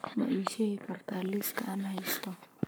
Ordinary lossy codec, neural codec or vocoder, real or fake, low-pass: none; codec, 44.1 kHz, 7.8 kbps, Pupu-Codec; fake; none